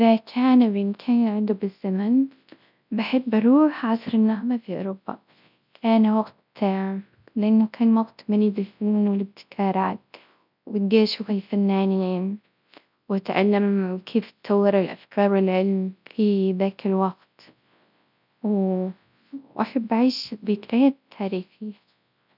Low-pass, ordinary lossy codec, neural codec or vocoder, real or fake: 5.4 kHz; none; codec, 24 kHz, 0.9 kbps, WavTokenizer, large speech release; fake